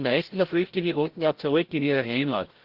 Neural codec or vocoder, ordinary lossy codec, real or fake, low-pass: codec, 16 kHz, 0.5 kbps, FreqCodec, larger model; Opus, 16 kbps; fake; 5.4 kHz